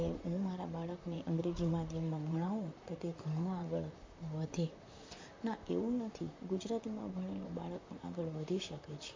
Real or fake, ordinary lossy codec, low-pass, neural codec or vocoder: fake; MP3, 48 kbps; 7.2 kHz; vocoder, 44.1 kHz, 128 mel bands, Pupu-Vocoder